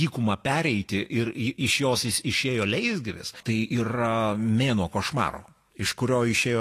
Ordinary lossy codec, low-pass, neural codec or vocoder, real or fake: AAC, 48 kbps; 14.4 kHz; autoencoder, 48 kHz, 128 numbers a frame, DAC-VAE, trained on Japanese speech; fake